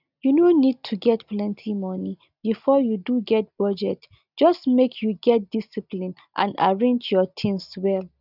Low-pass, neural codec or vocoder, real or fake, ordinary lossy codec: 5.4 kHz; none; real; none